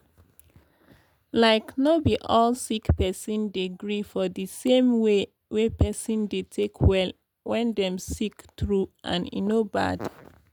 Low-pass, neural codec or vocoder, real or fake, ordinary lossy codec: none; none; real; none